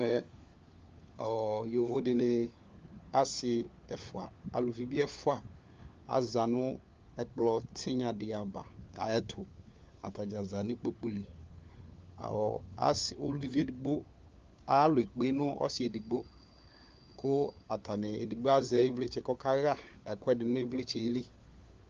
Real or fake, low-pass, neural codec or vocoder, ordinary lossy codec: fake; 7.2 kHz; codec, 16 kHz, 4 kbps, FunCodec, trained on LibriTTS, 50 frames a second; Opus, 32 kbps